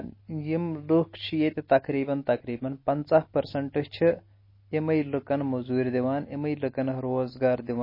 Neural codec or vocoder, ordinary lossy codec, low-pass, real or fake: none; MP3, 24 kbps; 5.4 kHz; real